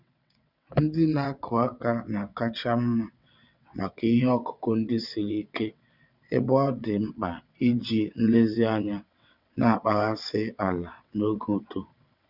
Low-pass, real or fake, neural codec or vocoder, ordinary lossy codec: 5.4 kHz; fake; vocoder, 22.05 kHz, 80 mel bands, WaveNeXt; none